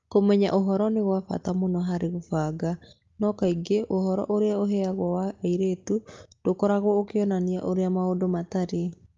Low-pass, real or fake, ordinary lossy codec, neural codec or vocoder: 7.2 kHz; real; Opus, 32 kbps; none